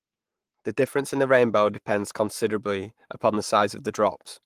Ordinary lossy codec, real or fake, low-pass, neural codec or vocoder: Opus, 24 kbps; fake; 14.4 kHz; codec, 44.1 kHz, 7.8 kbps, Pupu-Codec